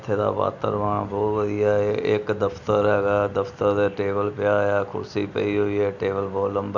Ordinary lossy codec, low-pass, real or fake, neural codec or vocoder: none; 7.2 kHz; real; none